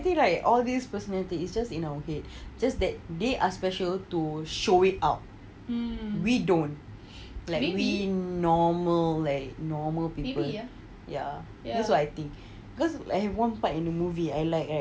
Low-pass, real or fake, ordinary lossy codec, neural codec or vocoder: none; real; none; none